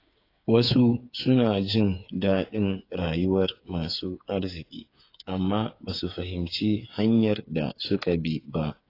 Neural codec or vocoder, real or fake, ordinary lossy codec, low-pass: codec, 16 kHz, 8 kbps, FreqCodec, smaller model; fake; AAC, 32 kbps; 5.4 kHz